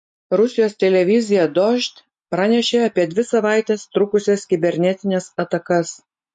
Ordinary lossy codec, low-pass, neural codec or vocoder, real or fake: MP3, 48 kbps; 9.9 kHz; none; real